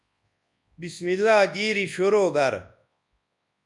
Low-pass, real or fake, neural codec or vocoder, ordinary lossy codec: 10.8 kHz; fake; codec, 24 kHz, 0.9 kbps, WavTokenizer, large speech release; MP3, 96 kbps